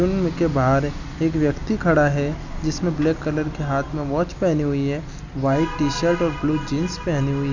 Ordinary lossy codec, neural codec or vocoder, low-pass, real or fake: none; none; 7.2 kHz; real